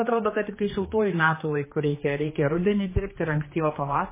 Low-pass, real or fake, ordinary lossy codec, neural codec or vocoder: 3.6 kHz; fake; MP3, 16 kbps; codec, 16 kHz, 2 kbps, X-Codec, HuBERT features, trained on general audio